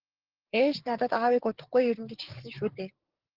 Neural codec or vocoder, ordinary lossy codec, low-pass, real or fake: codec, 16 kHz, 6 kbps, DAC; Opus, 16 kbps; 5.4 kHz; fake